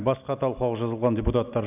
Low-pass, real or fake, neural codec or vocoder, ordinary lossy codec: 3.6 kHz; real; none; none